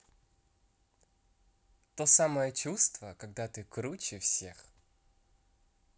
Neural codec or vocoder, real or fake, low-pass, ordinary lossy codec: none; real; none; none